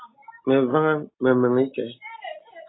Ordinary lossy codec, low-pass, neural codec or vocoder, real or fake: AAC, 16 kbps; 7.2 kHz; none; real